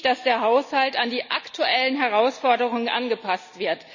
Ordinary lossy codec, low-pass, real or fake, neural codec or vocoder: none; 7.2 kHz; real; none